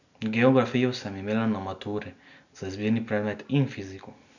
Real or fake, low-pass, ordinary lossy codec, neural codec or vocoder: real; 7.2 kHz; none; none